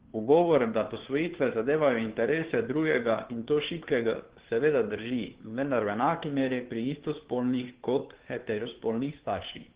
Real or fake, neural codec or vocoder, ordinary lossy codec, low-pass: fake; codec, 16 kHz, 2 kbps, FunCodec, trained on Chinese and English, 25 frames a second; Opus, 16 kbps; 3.6 kHz